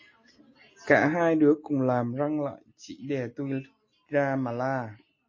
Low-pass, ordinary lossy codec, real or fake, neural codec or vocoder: 7.2 kHz; MP3, 32 kbps; real; none